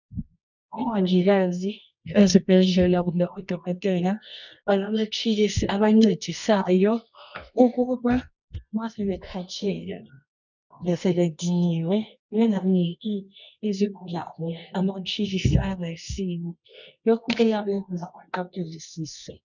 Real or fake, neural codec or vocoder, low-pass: fake; codec, 24 kHz, 0.9 kbps, WavTokenizer, medium music audio release; 7.2 kHz